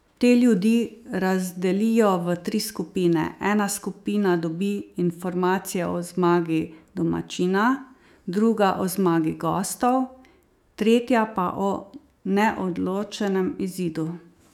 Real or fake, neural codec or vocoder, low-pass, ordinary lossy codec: fake; autoencoder, 48 kHz, 128 numbers a frame, DAC-VAE, trained on Japanese speech; 19.8 kHz; none